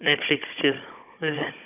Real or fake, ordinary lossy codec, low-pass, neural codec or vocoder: fake; none; 3.6 kHz; codec, 16 kHz, 16 kbps, FunCodec, trained on Chinese and English, 50 frames a second